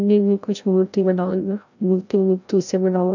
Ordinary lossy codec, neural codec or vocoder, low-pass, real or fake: none; codec, 16 kHz, 0.5 kbps, FreqCodec, larger model; 7.2 kHz; fake